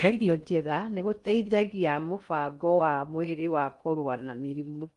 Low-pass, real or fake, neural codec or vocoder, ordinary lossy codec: 10.8 kHz; fake; codec, 16 kHz in and 24 kHz out, 0.6 kbps, FocalCodec, streaming, 4096 codes; Opus, 32 kbps